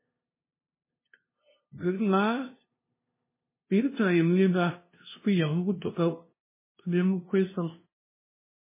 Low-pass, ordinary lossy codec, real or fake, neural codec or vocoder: 3.6 kHz; MP3, 16 kbps; fake; codec, 16 kHz, 0.5 kbps, FunCodec, trained on LibriTTS, 25 frames a second